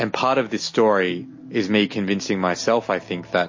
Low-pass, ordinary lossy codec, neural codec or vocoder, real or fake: 7.2 kHz; MP3, 32 kbps; none; real